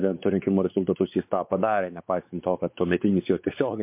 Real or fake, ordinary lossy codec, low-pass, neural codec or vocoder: fake; MP3, 32 kbps; 3.6 kHz; codec, 16 kHz, 4 kbps, X-Codec, WavLM features, trained on Multilingual LibriSpeech